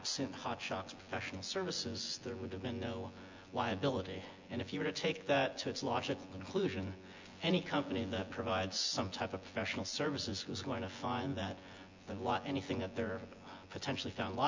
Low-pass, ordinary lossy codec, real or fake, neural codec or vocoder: 7.2 kHz; MP3, 64 kbps; fake; vocoder, 24 kHz, 100 mel bands, Vocos